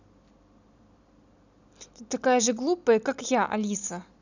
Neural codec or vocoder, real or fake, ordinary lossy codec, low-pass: none; real; none; 7.2 kHz